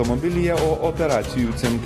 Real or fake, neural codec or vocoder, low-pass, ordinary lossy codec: real; none; 14.4 kHz; AAC, 48 kbps